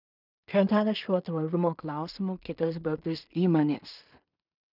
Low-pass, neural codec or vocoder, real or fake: 5.4 kHz; codec, 16 kHz in and 24 kHz out, 0.4 kbps, LongCat-Audio-Codec, two codebook decoder; fake